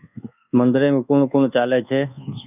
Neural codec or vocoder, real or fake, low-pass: codec, 24 kHz, 1.2 kbps, DualCodec; fake; 3.6 kHz